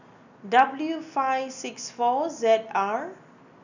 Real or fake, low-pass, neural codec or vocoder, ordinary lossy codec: real; 7.2 kHz; none; none